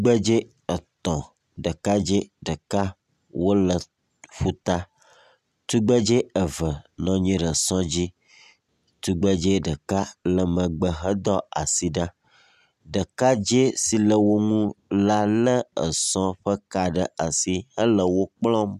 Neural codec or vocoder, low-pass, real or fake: none; 14.4 kHz; real